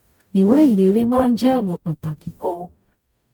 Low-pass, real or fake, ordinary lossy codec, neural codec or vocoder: 19.8 kHz; fake; MP3, 96 kbps; codec, 44.1 kHz, 0.9 kbps, DAC